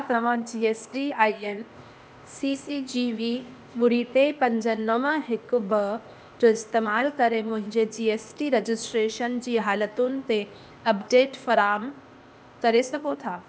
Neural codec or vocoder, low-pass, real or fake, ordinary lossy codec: codec, 16 kHz, 0.8 kbps, ZipCodec; none; fake; none